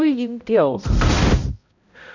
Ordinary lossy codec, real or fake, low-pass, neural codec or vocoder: none; fake; 7.2 kHz; codec, 16 kHz, 0.5 kbps, X-Codec, HuBERT features, trained on balanced general audio